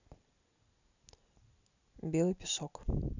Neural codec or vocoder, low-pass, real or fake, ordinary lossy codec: none; 7.2 kHz; real; none